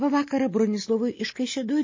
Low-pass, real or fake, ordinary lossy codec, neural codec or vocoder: 7.2 kHz; real; MP3, 32 kbps; none